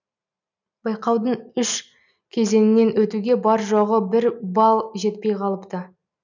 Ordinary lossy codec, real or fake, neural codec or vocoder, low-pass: none; real; none; 7.2 kHz